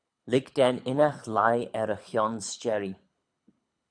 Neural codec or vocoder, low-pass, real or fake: codec, 24 kHz, 6 kbps, HILCodec; 9.9 kHz; fake